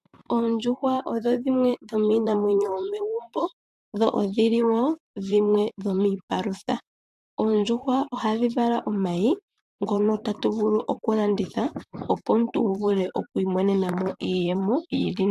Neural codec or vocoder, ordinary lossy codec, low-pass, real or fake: vocoder, 44.1 kHz, 128 mel bands, Pupu-Vocoder; AAC, 96 kbps; 14.4 kHz; fake